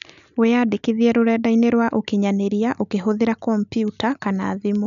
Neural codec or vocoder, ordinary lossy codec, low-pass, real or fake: none; none; 7.2 kHz; real